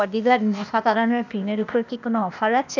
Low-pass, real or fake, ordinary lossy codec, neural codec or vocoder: 7.2 kHz; fake; none; codec, 16 kHz, 0.8 kbps, ZipCodec